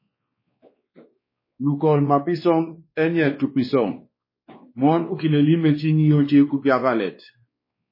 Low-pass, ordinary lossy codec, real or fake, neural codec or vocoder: 5.4 kHz; MP3, 24 kbps; fake; codec, 16 kHz, 2 kbps, X-Codec, WavLM features, trained on Multilingual LibriSpeech